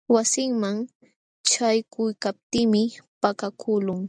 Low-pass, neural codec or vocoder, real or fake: 9.9 kHz; none; real